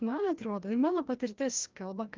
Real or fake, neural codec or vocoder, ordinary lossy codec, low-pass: fake; codec, 16 kHz, 1 kbps, FreqCodec, larger model; Opus, 24 kbps; 7.2 kHz